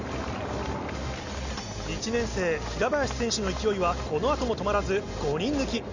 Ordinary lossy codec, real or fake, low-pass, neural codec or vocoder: Opus, 64 kbps; real; 7.2 kHz; none